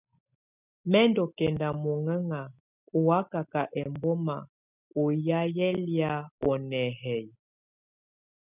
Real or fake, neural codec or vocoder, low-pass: real; none; 3.6 kHz